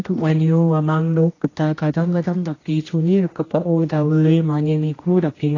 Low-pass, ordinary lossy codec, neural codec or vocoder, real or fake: 7.2 kHz; AAC, 32 kbps; codec, 16 kHz, 1 kbps, X-Codec, HuBERT features, trained on general audio; fake